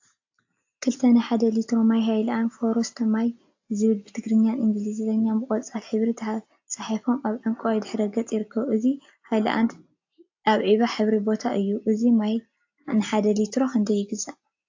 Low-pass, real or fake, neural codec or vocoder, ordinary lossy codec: 7.2 kHz; real; none; AAC, 48 kbps